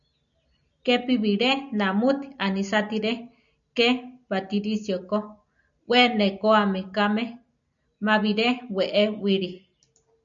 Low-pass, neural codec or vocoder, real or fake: 7.2 kHz; none; real